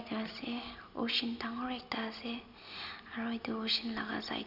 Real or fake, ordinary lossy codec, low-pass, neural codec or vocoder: real; none; 5.4 kHz; none